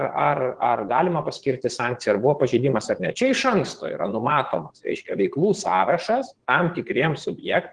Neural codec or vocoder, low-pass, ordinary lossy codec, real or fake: vocoder, 22.05 kHz, 80 mel bands, Vocos; 9.9 kHz; Opus, 16 kbps; fake